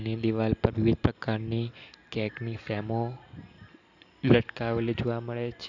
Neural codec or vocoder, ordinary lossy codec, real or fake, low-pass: none; none; real; 7.2 kHz